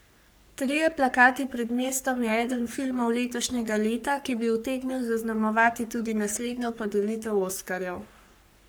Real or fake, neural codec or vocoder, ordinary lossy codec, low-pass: fake; codec, 44.1 kHz, 3.4 kbps, Pupu-Codec; none; none